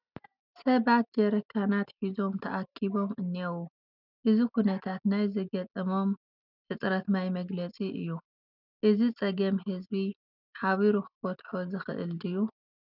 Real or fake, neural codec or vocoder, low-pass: real; none; 5.4 kHz